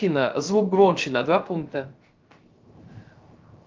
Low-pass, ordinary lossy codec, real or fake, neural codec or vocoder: 7.2 kHz; Opus, 32 kbps; fake; codec, 16 kHz, 0.3 kbps, FocalCodec